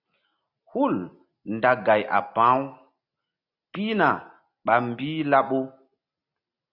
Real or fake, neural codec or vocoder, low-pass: real; none; 5.4 kHz